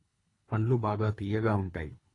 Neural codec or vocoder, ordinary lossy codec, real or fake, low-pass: codec, 24 kHz, 3 kbps, HILCodec; AAC, 32 kbps; fake; 10.8 kHz